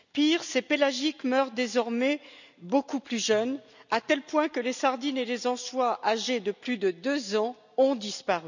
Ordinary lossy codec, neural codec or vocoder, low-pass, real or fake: none; none; 7.2 kHz; real